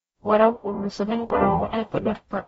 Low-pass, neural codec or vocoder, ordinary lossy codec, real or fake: 19.8 kHz; codec, 44.1 kHz, 0.9 kbps, DAC; AAC, 24 kbps; fake